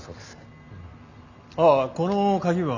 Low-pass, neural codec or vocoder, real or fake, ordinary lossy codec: 7.2 kHz; none; real; none